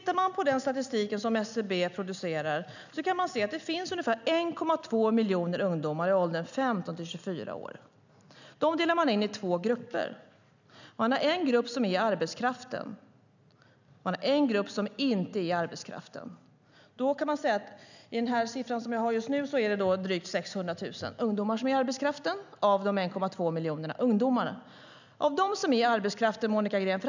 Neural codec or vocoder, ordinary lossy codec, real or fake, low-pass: none; none; real; 7.2 kHz